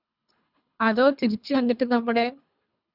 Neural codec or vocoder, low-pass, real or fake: codec, 24 kHz, 3 kbps, HILCodec; 5.4 kHz; fake